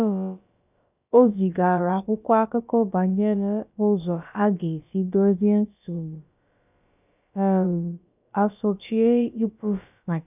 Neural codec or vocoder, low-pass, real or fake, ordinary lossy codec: codec, 16 kHz, about 1 kbps, DyCAST, with the encoder's durations; 3.6 kHz; fake; none